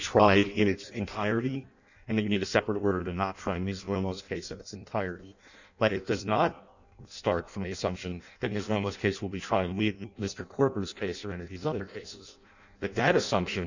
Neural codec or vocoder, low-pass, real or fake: codec, 16 kHz in and 24 kHz out, 0.6 kbps, FireRedTTS-2 codec; 7.2 kHz; fake